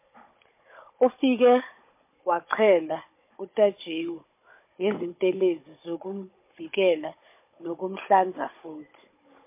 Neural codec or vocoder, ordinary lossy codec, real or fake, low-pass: codec, 16 kHz, 16 kbps, FunCodec, trained on Chinese and English, 50 frames a second; MP3, 24 kbps; fake; 3.6 kHz